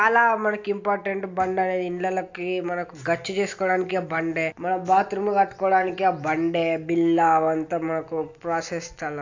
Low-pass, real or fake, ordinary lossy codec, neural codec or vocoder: 7.2 kHz; real; AAC, 48 kbps; none